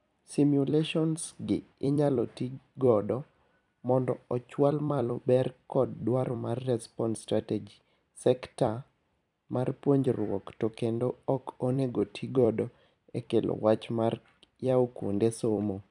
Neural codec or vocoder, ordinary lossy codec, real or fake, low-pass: vocoder, 44.1 kHz, 128 mel bands every 256 samples, BigVGAN v2; none; fake; 10.8 kHz